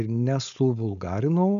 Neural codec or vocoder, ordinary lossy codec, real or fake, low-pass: codec, 16 kHz, 4.8 kbps, FACodec; AAC, 96 kbps; fake; 7.2 kHz